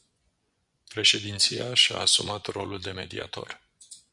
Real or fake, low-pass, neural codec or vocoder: fake; 10.8 kHz; vocoder, 24 kHz, 100 mel bands, Vocos